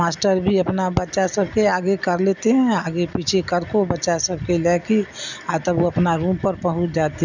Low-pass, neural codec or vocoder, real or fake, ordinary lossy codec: 7.2 kHz; none; real; none